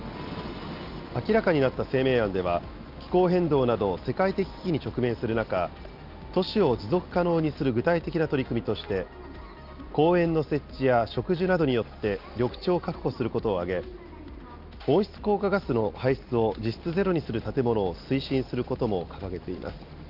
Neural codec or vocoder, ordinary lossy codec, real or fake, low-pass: none; Opus, 24 kbps; real; 5.4 kHz